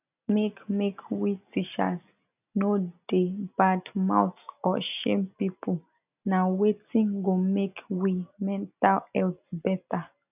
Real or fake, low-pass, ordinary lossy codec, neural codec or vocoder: real; 3.6 kHz; none; none